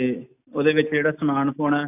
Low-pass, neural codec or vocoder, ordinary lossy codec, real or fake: 3.6 kHz; none; AAC, 32 kbps; real